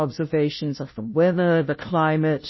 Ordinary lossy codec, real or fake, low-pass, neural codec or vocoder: MP3, 24 kbps; fake; 7.2 kHz; codec, 16 kHz, 0.5 kbps, FunCodec, trained on Chinese and English, 25 frames a second